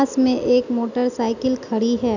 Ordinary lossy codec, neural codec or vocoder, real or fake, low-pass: none; none; real; 7.2 kHz